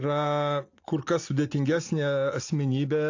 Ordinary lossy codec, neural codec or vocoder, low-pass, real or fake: AAC, 48 kbps; none; 7.2 kHz; real